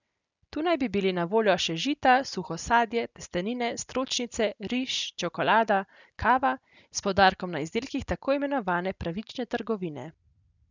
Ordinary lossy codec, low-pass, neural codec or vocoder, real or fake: none; 7.2 kHz; none; real